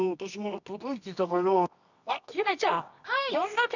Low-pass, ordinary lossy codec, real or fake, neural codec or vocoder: 7.2 kHz; none; fake; codec, 24 kHz, 0.9 kbps, WavTokenizer, medium music audio release